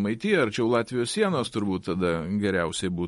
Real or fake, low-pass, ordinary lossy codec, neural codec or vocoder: real; 14.4 kHz; MP3, 48 kbps; none